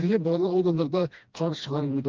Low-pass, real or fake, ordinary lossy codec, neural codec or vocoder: 7.2 kHz; fake; Opus, 32 kbps; codec, 16 kHz, 1 kbps, FreqCodec, smaller model